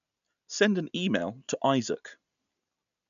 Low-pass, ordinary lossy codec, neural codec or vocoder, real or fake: 7.2 kHz; none; none; real